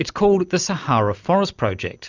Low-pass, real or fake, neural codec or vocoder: 7.2 kHz; real; none